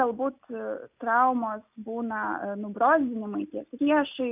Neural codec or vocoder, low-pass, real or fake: none; 3.6 kHz; real